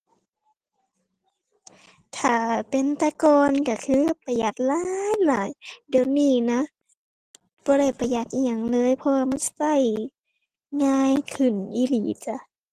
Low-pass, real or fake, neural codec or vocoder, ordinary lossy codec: 14.4 kHz; real; none; Opus, 16 kbps